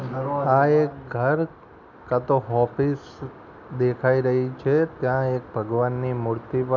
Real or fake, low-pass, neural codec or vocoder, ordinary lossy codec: real; 7.2 kHz; none; none